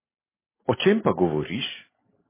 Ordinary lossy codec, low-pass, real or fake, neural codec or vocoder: MP3, 16 kbps; 3.6 kHz; real; none